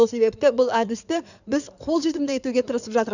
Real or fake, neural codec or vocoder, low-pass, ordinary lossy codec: fake; codec, 16 kHz in and 24 kHz out, 2.2 kbps, FireRedTTS-2 codec; 7.2 kHz; none